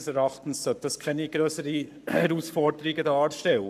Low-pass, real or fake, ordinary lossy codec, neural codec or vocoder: 14.4 kHz; fake; none; codec, 44.1 kHz, 7.8 kbps, Pupu-Codec